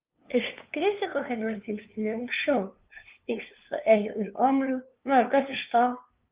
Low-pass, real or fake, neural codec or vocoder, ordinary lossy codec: 3.6 kHz; fake; codec, 16 kHz, 2 kbps, FunCodec, trained on LibriTTS, 25 frames a second; Opus, 32 kbps